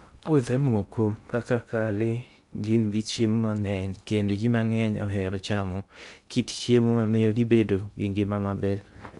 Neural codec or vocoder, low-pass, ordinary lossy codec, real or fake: codec, 16 kHz in and 24 kHz out, 0.6 kbps, FocalCodec, streaming, 2048 codes; 10.8 kHz; none; fake